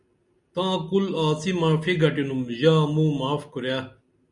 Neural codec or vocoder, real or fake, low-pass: none; real; 10.8 kHz